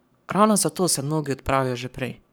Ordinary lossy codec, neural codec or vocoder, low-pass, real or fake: none; codec, 44.1 kHz, 7.8 kbps, Pupu-Codec; none; fake